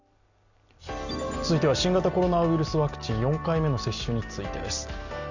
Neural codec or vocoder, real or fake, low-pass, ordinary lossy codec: none; real; 7.2 kHz; none